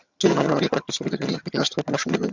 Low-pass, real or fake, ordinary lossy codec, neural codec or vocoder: 7.2 kHz; fake; Opus, 64 kbps; vocoder, 22.05 kHz, 80 mel bands, HiFi-GAN